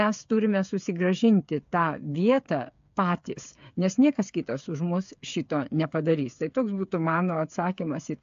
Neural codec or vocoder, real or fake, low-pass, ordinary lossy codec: codec, 16 kHz, 8 kbps, FreqCodec, smaller model; fake; 7.2 kHz; AAC, 64 kbps